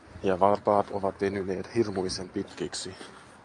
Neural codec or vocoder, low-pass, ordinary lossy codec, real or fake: none; 10.8 kHz; AAC, 64 kbps; real